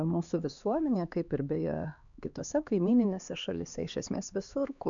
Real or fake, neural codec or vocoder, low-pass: fake; codec, 16 kHz, 2 kbps, X-Codec, HuBERT features, trained on LibriSpeech; 7.2 kHz